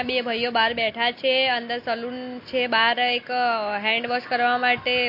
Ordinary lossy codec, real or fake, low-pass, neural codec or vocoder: MP3, 32 kbps; real; 5.4 kHz; none